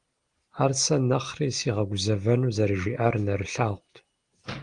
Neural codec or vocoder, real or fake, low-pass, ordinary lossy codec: none; real; 9.9 kHz; Opus, 24 kbps